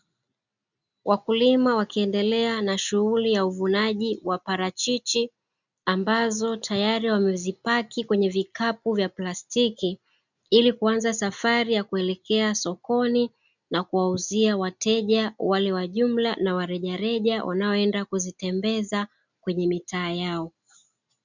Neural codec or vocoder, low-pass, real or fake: none; 7.2 kHz; real